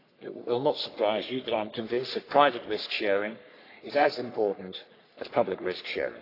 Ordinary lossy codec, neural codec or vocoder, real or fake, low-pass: AAC, 32 kbps; codec, 44.1 kHz, 3.4 kbps, Pupu-Codec; fake; 5.4 kHz